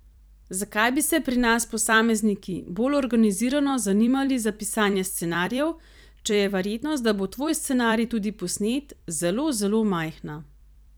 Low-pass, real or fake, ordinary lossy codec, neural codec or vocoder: none; fake; none; vocoder, 44.1 kHz, 128 mel bands every 256 samples, BigVGAN v2